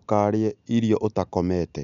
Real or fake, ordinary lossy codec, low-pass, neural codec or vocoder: real; none; 7.2 kHz; none